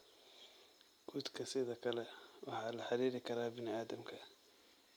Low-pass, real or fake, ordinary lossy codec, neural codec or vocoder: none; real; none; none